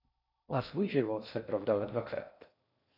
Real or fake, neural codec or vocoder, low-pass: fake; codec, 16 kHz in and 24 kHz out, 0.6 kbps, FocalCodec, streaming, 4096 codes; 5.4 kHz